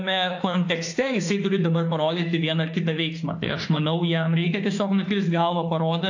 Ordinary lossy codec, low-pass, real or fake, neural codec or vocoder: AAC, 48 kbps; 7.2 kHz; fake; autoencoder, 48 kHz, 32 numbers a frame, DAC-VAE, trained on Japanese speech